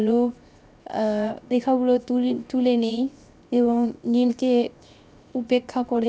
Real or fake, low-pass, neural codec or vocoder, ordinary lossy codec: fake; none; codec, 16 kHz, 0.7 kbps, FocalCodec; none